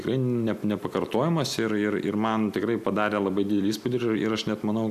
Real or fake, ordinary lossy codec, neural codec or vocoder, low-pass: real; AAC, 96 kbps; none; 14.4 kHz